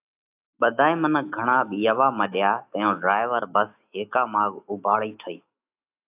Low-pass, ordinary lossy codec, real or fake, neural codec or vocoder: 3.6 kHz; AAC, 32 kbps; fake; autoencoder, 48 kHz, 128 numbers a frame, DAC-VAE, trained on Japanese speech